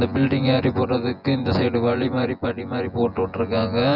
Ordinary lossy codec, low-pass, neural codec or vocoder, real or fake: none; 5.4 kHz; vocoder, 24 kHz, 100 mel bands, Vocos; fake